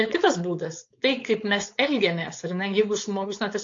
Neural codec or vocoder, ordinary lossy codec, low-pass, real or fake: codec, 16 kHz, 4.8 kbps, FACodec; AAC, 48 kbps; 7.2 kHz; fake